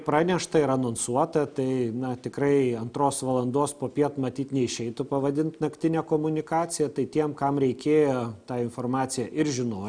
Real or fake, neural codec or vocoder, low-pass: real; none; 9.9 kHz